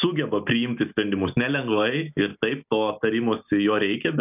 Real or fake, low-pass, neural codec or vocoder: real; 3.6 kHz; none